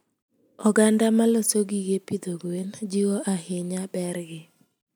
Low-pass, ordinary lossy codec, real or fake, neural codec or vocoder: none; none; real; none